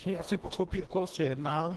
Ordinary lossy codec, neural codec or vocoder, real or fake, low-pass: Opus, 16 kbps; codec, 24 kHz, 1.5 kbps, HILCodec; fake; 10.8 kHz